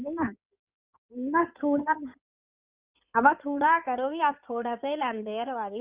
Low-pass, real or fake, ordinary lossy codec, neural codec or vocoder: 3.6 kHz; fake; Opus, 64 kbps; codec, 16 kHz in and 24 kHz out, 2.2 kbps, FireRedTTS-2 codec